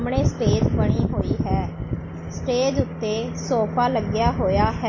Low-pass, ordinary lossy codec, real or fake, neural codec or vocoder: 7.2 kHz; MP3, 32 kbps; real; none